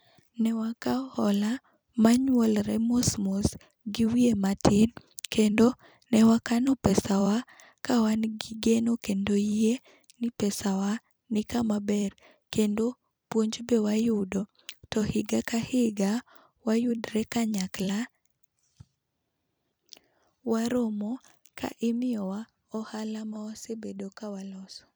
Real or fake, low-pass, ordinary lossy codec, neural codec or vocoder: fake; none; none; vocoder, 44.1 kHz, 128 mel bands every 256 samples, BigVGAN v2